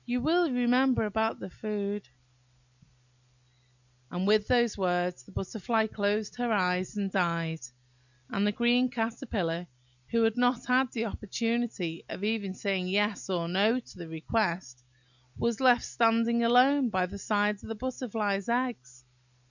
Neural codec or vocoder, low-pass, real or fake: none; 7.2 kHz; real